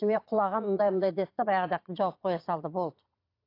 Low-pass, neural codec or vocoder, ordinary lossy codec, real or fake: 5.4 kHz; vocoder, 22.05 kHz, 80 mel bands, Vocos; AAC, 32 kbps; fake